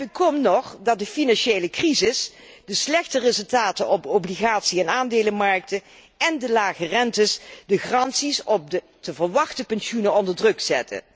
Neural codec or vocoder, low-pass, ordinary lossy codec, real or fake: none; none; none; real